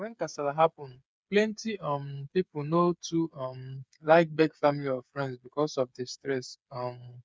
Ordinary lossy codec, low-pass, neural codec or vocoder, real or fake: none; none; codec, 16 kHz, 8 kbps, FreqCodec, smaller model; fake